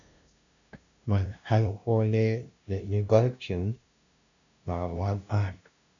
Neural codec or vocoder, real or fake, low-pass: codec, 16 kHz, 0.5 kbps, FunCodec, trained on LibriTTS, 25 frames a second; fake; 7.2 kHz